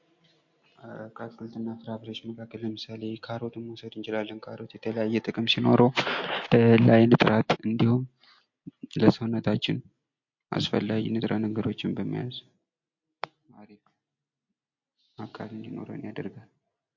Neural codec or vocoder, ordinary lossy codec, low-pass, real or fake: none; MP3, 48 kbps; 7.2 kHz; real